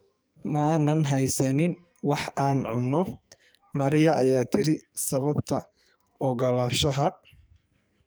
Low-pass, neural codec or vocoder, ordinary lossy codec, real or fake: none; codec, 44.1 kHz, 2.6 kbps, SNAC; none; fake